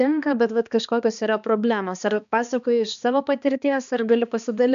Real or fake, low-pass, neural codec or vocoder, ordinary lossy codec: fake; 7.2 kHz; codec, 16 kHz, 2 kbps, X-Codec, HuBERT features, trained on balanced general audio; MP3, 96 kbps